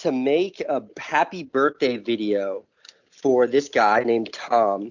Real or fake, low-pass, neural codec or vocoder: real; 7.2 kHz; none